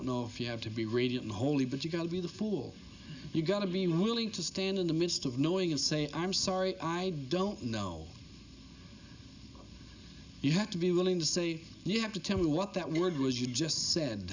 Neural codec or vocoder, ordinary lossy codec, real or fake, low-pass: none; Opus, 64 kbps; real; 7.2 kHz